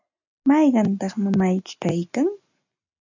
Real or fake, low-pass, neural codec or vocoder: real; 7.2 kHz; none